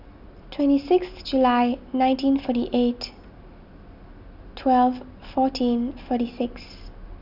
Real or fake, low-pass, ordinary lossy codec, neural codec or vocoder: real; 5.4 kHz; none; none